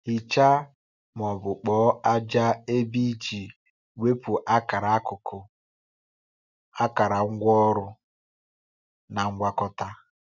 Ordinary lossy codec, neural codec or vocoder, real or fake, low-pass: none; none; real; 7.2 kHz